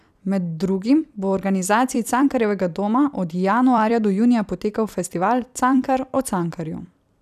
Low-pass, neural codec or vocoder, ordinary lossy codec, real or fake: 14.4 kHz; vocoder, 44.1 kHz, 128 mel bands every 256 samples, BigVGAN v2; none; fake